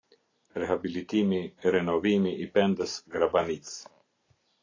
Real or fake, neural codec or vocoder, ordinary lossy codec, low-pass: real; none; AAC, 32 kbps; 7.2 kHz